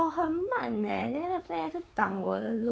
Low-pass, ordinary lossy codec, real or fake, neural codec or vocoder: none; none; fake; codec, 16 kHz, 4 kbps, X-Codec, WavLM features, trained on Multilingual LibriSpeech